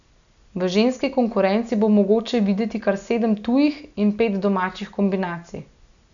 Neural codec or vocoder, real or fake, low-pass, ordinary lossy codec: none; real; 7.2 kHz; none